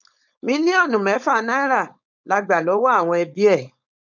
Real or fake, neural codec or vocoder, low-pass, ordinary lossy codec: fake; codec, 16 kHz, 4.8 kbps, FACodec; 7.2 kHz; none